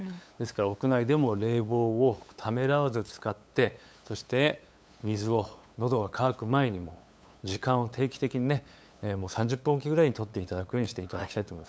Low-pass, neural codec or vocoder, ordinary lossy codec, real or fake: none; codec, 16 kHz, 8 kbps, FunCodec, trained on LibriTTS, 25 frames a second; none; fake